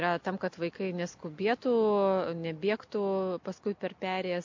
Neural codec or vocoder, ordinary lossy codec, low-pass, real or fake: none; MP3, 48 kbps; 7.2 kHz; real